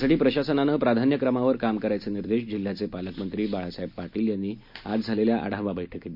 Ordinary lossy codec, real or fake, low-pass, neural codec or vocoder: MP3, 48 kbps; real; 5.4 kHz; none